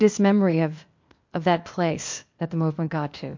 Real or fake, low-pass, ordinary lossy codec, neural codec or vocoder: fake; 7.2 kHz; MP3, 48 kbps; codec, 16 kHz, 0.8 kbps, ZipCodec